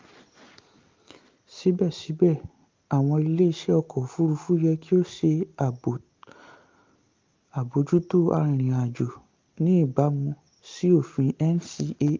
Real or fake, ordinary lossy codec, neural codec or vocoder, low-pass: real; Opus, 24 kbps; none; 7.2 kHz